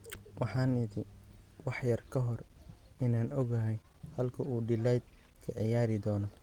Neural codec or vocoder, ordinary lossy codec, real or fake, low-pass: none; Opus, 24 kbps; real; 19.8 kHz